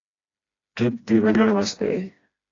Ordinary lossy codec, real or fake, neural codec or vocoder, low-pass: AAC, 32 kbps; fake; codec, 16 kHz, 1 kbps, FreqCodec, smaller model; 7.2 kHz